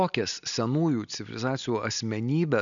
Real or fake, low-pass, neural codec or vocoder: real; 7.2 kHz; none